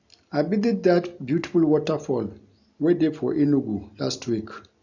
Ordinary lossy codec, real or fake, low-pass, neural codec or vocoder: none; real; 7.2 kHz; none